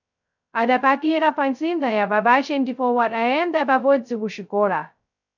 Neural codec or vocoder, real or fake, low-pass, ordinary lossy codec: codec, 16 kHz, 0.2 kbps, FocalCodec; fake; 7.2 kHz; MP3, 64 kbps